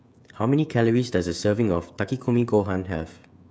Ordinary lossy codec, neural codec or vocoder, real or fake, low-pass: none; codec, 16 kHz, 16 kbps, FreqCodec, smaller model; fake; none